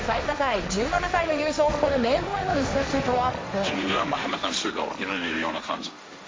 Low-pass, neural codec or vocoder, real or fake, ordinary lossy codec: none; codec, 16 kHz, 1.1 kbps, Voila-Tokenizer; fake; none